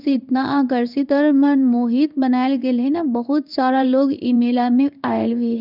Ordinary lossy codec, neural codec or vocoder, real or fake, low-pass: none; codec, 16 kHz in and 24 kHz out, 1 kbps, XY-Tokenizer; fake; 5.4 kHz